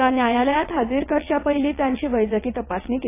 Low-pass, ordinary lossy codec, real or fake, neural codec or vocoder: 3.6 kHz; AAC, 24 kbps; fake; vocoder, 22.05 kHz, 80 mel bands, WaveNeXt